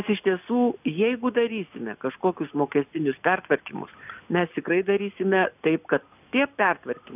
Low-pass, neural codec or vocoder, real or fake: 3.6 kHz; none; real